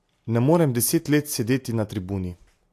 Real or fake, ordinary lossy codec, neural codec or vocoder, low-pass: real; AAC, 64 kbps; none; 14.4 kHz